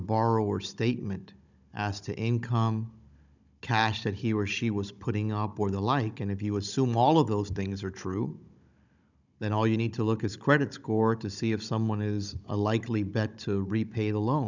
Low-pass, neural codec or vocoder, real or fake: 7.2 kHz; codec, 16 kHz, 16 kbps, FunCodec, trained on Chinese and English, 50 frames a second; fake